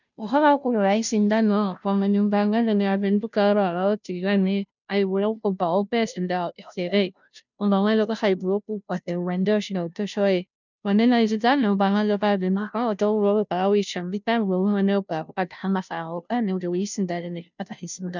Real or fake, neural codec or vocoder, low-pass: fake; codec, 16 kHz, 0.5 kbps, FunCodec, trained on Chinese and English, 25 frames a second; 7.2 kHz